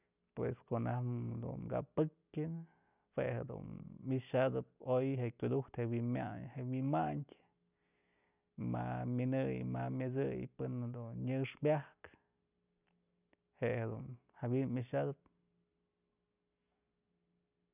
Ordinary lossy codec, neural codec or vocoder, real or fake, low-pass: none; none; real; 3.6 kHz